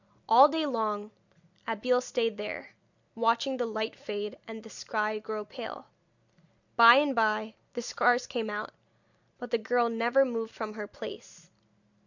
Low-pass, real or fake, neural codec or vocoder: 7.2 kHz; real; none